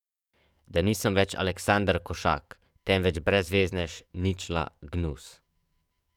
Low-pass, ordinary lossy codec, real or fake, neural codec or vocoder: 19.8 kHz; none; fake; codec, 44.1 kHz, 7.8 kbps, DAC